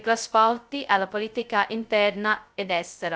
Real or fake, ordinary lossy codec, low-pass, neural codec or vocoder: fake; none; none; codec, 16 kHz, 0.2 kbps, FocalCodec